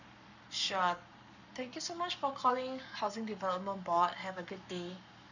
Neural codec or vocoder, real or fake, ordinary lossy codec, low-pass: codec, 44.1 kHz, 7.8 kbps, Pupu-Codec; fake; none; 7.2 kHz